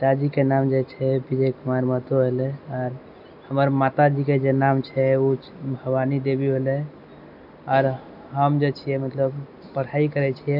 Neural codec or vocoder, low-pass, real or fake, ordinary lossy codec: none; 5.4 kHz; real; none